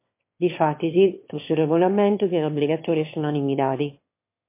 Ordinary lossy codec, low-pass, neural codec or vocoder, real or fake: MP3, 24 kbps; 3.6 kHz; autoencoder, 22.05 kHz, a latent of 192 numbers a frame, VITS, trained on one speaker; fake